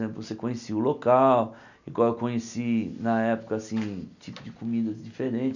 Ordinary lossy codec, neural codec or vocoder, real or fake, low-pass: none; none; real; 7.2 kHz